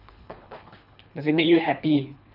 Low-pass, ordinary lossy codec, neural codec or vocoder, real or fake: 5.4 kHz; none; codec, 24 kHz, 3 kbps, HILCodec; fake